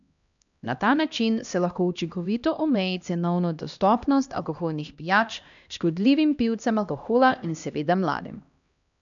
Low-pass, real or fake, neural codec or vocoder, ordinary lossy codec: 7.2 kHz; fake; codec, 16 kHz, 1 kbps, X-Codec, HuBERT features, trained on LibriSpeech; none